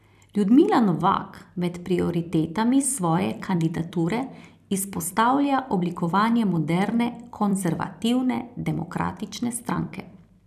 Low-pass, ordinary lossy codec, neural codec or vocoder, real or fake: 14.4 kHz; none; vocoder, 44.1 kHz, 128 mel bands every 256 samples, BigVGAN v2; fake